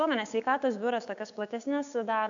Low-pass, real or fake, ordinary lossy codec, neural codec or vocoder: 7.2 kHz; fake; AAC, 64 kbps; codec, 16 kHz, 6 kbps, DAC